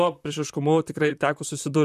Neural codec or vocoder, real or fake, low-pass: vocoder, 44.1 kHz, 128 mel bands, Pupu-Vocoder; fake; 14.4 kHz